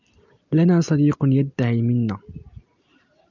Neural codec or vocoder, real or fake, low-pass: none; real; 7.2 kHz